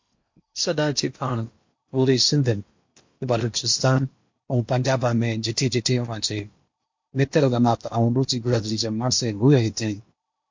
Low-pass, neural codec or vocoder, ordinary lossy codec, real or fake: 7.2 kHz; codec, 16 kHz in and 24 kHz out, 0.8 kbps, FocalCodec, streaming, 65536 codes; MP3, 48 kbps; fake